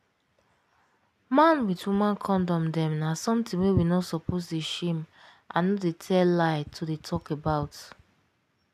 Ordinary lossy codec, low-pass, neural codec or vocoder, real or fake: none; 14.4 kHz; none; real